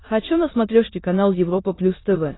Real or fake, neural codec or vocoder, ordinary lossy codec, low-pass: fake; autoencoder, 22.05 kHz, a latent of 192 numbers a frame, VITS, trained on many speakers; AAC, 16 kbps; 7.2 kHz